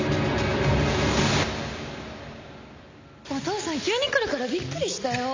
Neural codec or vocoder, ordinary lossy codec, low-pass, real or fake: none; MP3, 64 kbps; 7.2 kHz; real